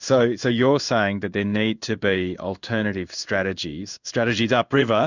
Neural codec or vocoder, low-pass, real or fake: codec, 16 kHz in and 24 kHz out, 1 kbps, XY-Tokenizer; 7.2 kHz; fake